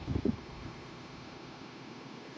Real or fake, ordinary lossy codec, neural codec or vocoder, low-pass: fake; none; codec, 16 kHz, 0.9 kbps, LongCat-Audio-Codec; none